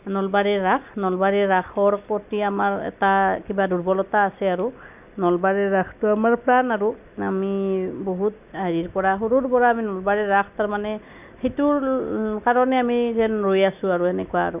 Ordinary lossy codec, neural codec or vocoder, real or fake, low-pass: none; none; real; 3.6 kHz